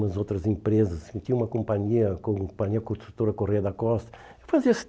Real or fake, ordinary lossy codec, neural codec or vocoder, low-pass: real; none; none; none